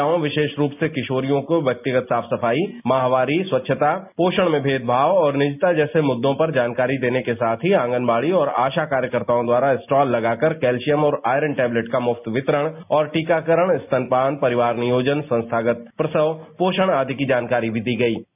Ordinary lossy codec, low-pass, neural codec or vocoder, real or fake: none; 3.6 kHz; none; real